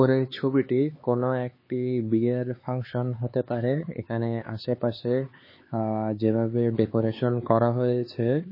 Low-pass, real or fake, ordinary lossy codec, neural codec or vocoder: 5.4 kHz; fake; MP3, 24 kbps; codec, 16 kHz, 4 kbps, X-Codec, HuBERT features, trained on LibriSpeech